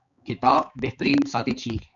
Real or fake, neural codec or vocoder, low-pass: fake; codec, 16 kHz, 4 kbps, X-Codec, HuBERT features, trained on balanced general audio; 7.2 kHz